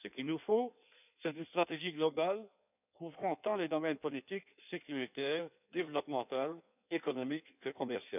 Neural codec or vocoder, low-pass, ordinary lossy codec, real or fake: codec, 16 kHz in and 24 kHz out, 1.1 kbps, FireRedTTS-2 codec; 3.6 kHz; none; fake